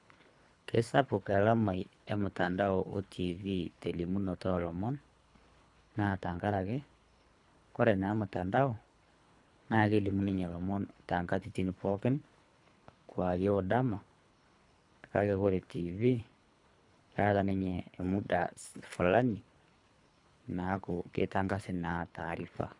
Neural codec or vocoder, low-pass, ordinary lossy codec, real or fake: codec, 24 kHz, 3 kbps, HILCodec; 10.8 kHz; none; fake